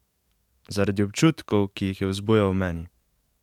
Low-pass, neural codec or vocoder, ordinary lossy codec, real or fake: 19.8 kHz; codec, 44.1 kHz, 7.8 kbps, DAC; MP3, 96 kbps; fake